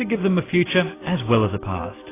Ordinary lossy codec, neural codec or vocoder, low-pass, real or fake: AAC, 16 kbps; none; 3.6 kHz; real